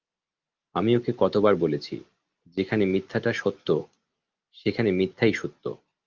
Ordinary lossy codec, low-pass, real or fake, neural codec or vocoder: Opus, 24 kbps; 7.2 kHz; real; none